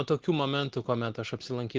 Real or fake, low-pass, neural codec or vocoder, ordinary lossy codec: real; 7.2 kHz; none; Opus, 16 kbps